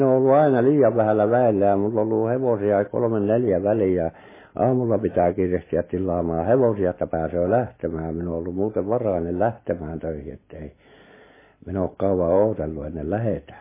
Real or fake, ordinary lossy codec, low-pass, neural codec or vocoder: real; MP3, 16 kbps; 3.6 kHz; none